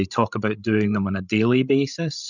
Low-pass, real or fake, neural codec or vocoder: 7.2 kHz; fake; codec, 16 kHz, 16 kbps, FreqCodec, larger model